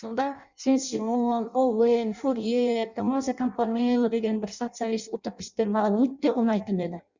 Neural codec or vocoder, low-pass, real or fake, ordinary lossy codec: codec, 16 kHz in and 24 kHz out, 0.6 kbps, FireRedTTS-2 codec; 7.2 kHz; fake; Opus, 64 kbps